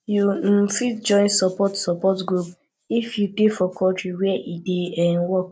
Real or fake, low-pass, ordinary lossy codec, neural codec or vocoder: real; none; none; none